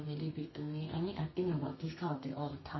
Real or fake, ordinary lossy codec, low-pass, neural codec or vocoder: fake; MP3, 24 kbps; 7.2 kHz; codec, 32 kHz, 1.9 kbps, SNAC